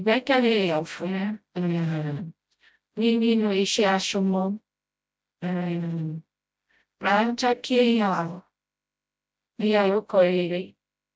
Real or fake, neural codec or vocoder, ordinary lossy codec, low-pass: fake; codec, 16 kHz, 0.5 kbps, FreqCodec, smaller model; none; none